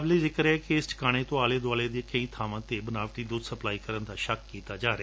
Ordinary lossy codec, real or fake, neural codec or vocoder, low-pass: none; real; none; none